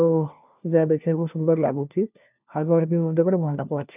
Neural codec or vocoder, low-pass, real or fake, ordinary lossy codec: codec, 16 kHz, 1 kbps, FunCodec, trained on LibriTTS, 50 frames a second; 3.6 kHz; fake; none